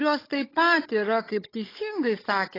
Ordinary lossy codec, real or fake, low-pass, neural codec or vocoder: AAC, 24 kbps; fake; 5.4 kHz; codec, 16 kHz, 16 kbps, FunCodec, trained on Chinese and English, 50 frames a second